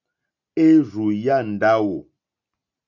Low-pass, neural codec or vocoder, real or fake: 7.2 kHz; none; real